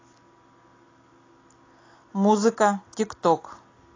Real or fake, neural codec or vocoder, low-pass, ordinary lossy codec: real; none; 7.2 kHz; AAC, 32 kbps